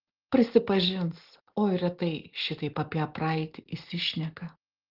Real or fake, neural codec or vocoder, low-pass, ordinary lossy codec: real; none; 5.4 kHz; Opus, 16 kbps